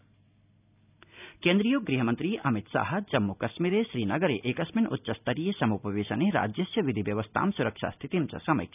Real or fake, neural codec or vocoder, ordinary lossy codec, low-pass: real; none; none; 3.6 kHz